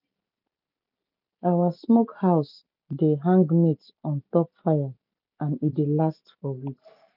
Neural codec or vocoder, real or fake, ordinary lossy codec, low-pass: none; real; none; 5.4 kHz